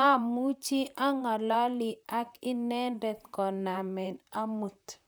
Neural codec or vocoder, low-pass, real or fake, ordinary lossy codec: vocoder, 44.1 kHz, 128 mel bands, Pupu-Vocoder; none; fake; none